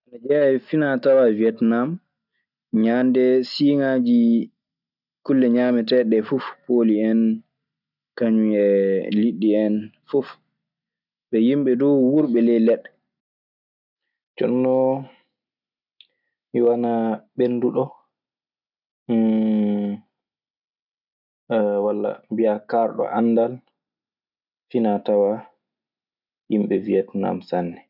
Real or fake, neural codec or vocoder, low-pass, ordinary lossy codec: real; none; 5.4 kHz; none